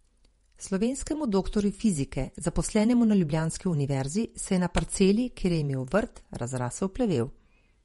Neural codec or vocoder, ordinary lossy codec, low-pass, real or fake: vocoder, 48 kHz, 128 mel bands, Vocos; MP3, 48 kbps; 19.8 kHz; fake